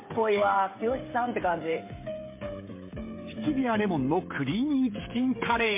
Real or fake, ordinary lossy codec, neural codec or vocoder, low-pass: fake; MP3, 24 kbps; codec, 16 kHz, 16 kbps, FreqCodec, smaller model; 3.6 kHz